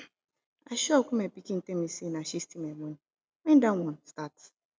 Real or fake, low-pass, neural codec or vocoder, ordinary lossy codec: real; none; none; none